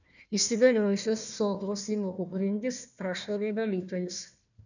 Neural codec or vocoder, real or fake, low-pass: codec, 16 kHz, 1 kbps, FunCodec, trained on Chinese and English, 50 frames a second; fake; 7.2 kHz